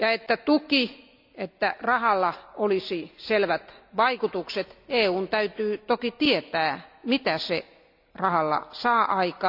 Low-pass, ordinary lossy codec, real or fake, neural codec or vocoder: 5.4 kHz; none; real; none